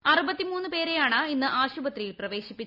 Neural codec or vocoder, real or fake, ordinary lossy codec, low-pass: none; real; none; 5.4 kHz